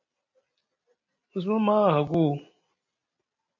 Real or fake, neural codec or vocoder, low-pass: real; none; 7.2 kHz